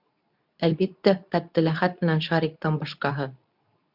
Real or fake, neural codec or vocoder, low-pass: fake; codec, 24 kHz, 0.9 kbps, WavTokenizer, medium speech release version 2; 5.4 kHz